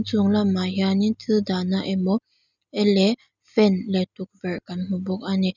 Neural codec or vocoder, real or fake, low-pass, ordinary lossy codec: none; real; 7.2 kHz; none